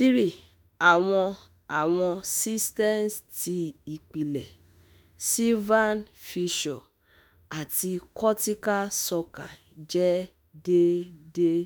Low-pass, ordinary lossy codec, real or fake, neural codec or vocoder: none; none; fake; autoencoder, 48 kHz, 32 numbers a frame, DAC-VAE, trained on Japanese speech